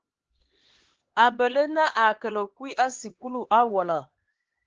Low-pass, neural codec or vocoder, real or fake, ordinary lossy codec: 7.2 kHz; codec, 16 kHz, 2 kbps, X-Codec, HuBERT features, trained on LibriSpeech; fake; Opus, 16 kbps